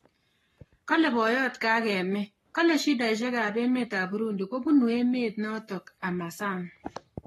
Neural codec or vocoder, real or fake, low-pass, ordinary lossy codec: codec, 44.1 kHz, 7.8 kbps, Pupu-Codec; fake; 19.8 kHz; AAC, 32 kbps